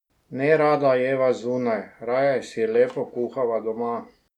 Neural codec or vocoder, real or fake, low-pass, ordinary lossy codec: none; real; 19.8 kHz; none